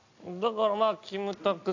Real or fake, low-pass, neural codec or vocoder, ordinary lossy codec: real; 7.2 kHz; none; AAC, 48 kbps